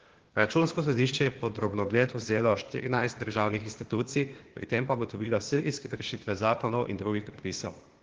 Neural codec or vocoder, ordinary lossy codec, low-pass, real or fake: codec, 16 kHz, 0.8 kbps, ZipCodec; Opus, 16 kbps; 7.2 kHz; fake